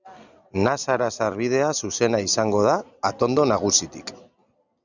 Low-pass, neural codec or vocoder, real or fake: 7.2 kHz; none; real